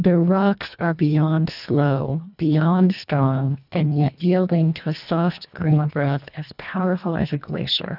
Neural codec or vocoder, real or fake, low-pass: codec, 24 kHz, 1.5 kbps, HILCodec; fake; 5.4 kHz